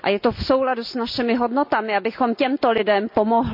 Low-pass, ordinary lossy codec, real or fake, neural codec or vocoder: 5.4 kHz; MP3, 48 kbps; real; none